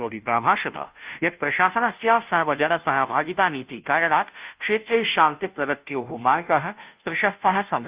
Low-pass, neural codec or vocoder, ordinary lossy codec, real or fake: 3.6 kHz; codec, 16 kHz, 0.5 kbps, FunCodec, trained on Chinese and English, 25 frames a second; Opus, 24 kbps; fake